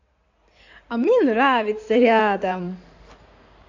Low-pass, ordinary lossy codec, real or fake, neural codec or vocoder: 7.2 kHz; AAC, 48 kbps; fake; codec, 16 kHz in and 24 kHz out, 2.2 kbps, FireRedTTS-2 codec